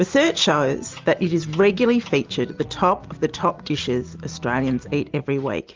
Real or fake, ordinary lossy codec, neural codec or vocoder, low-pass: real; Opus, 32 kbps; none; 7.2 kHz